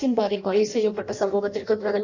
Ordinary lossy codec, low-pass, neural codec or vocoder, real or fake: none; 7.2 kHz; codec, 16 kHz in and 24 kHz out, 0.6 kbps, FireRedTTS-2 codec; fake